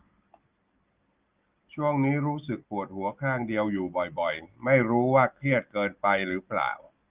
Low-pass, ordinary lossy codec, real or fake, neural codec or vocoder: 3.6 kHz; Opus, 32 kbps; real; none